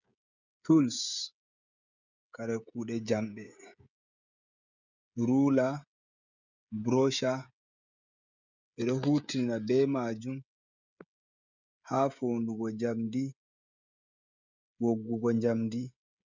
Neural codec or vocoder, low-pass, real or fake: codec, 16 kHz, 16 kbps, FreqCodec, smaller model; 7.2 kHz; fake